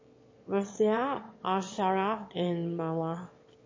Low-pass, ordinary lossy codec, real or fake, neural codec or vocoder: 7.2 kHz; MP3, 32 kbps; fake; autoencoder, 22.05 kHz, a latent of 192 numbers a frame, VITS, trained on one speaker